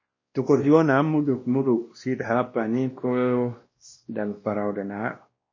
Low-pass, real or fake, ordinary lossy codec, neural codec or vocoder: 7.2 kHz; fake; MP3, 32 kbps; codec, 16 kHz, 1 kbps, X-Codec, WavLM features, trained on Multilingual LibriSpeech